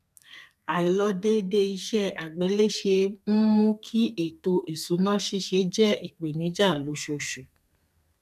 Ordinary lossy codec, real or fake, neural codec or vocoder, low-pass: none; fake; codec, 32 kHz, 1.9 kbps, SNAC; 14.4 kHz